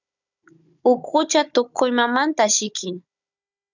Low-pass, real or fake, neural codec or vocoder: 7.2 kHz; fake; codec, 16 kHz, 16 kbps, FunCodec, trained on Chinese and English, 50 frames a second